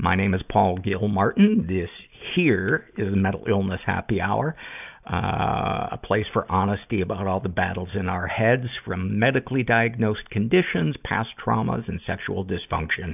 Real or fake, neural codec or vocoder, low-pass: real; none; 3.6 kHz